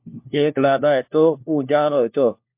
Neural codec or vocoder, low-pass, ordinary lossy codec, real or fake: codec, 16 kHz, 1 kbps, FunCodec, trained on LibriTTS, 50 frames a second; 3.6 kHz; MP3, 32 kbps; fake